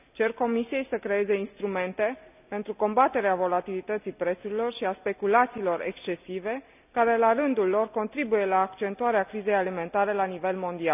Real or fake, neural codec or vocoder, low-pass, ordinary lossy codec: real; none; 3.6 kHz; none